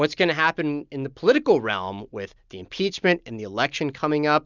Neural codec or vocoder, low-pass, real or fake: none; 7.2 kHz; real